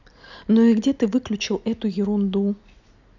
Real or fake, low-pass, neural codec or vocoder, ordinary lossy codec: real; 7.2 kHz; none; none